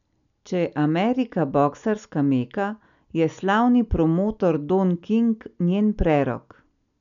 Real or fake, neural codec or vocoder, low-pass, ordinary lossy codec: real; none; 7.2 kHz; none